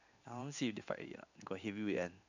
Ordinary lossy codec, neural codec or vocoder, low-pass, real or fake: none; codec, 16 kHz in and 24 kHz out, 1 kbps, XY-Tokenizer; 7.2 kHz; fake